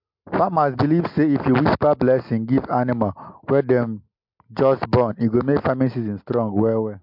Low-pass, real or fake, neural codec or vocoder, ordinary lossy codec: 5.4 kHz; real; none; MP3, 48 kbps